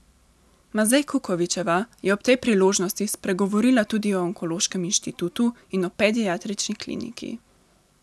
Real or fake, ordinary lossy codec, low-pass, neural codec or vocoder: real; none; none; none